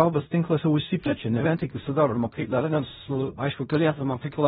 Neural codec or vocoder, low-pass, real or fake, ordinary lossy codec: codec, 16 kHz in and 24 kHz out, 0.4 kbps, LongCat-Audio-Codec, fine tuned four codebook decoder; 10.8 kHz; fake; AAC, 16 kbps